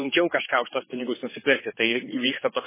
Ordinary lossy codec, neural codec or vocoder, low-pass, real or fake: MP3, 16 kbps; codec, 16 kHz, 4 kbps, X-Codec, HuBERT features, trained on general audio; 3.6 kHz; fake